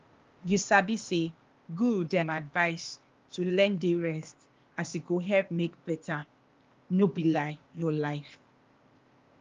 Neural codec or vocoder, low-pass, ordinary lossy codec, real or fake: codec, 16 kHz, 0.8 kbps, ZipCodec; 7.2 kHz; Opus, 32 kbps; fake